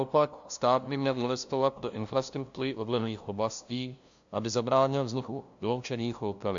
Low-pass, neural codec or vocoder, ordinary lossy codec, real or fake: 7.2 kHz; codec, 16 kHz, 0.5 kbps, FunCodec, trained on LibriTTS, 25 frames a second; AAC, 64 kbps; fake